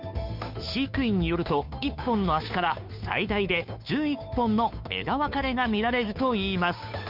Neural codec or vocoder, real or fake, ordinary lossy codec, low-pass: codec, 16 kHz, 2 kbps, FunCodec, trained on Chinese and English, 25 frames a second; fake; none; 5.4 kHz